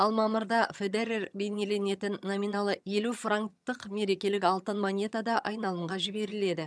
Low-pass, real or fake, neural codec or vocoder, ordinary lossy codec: none; fake; vocoder, 22.05 kHz, 80 mel bands, HiFi-GAN; none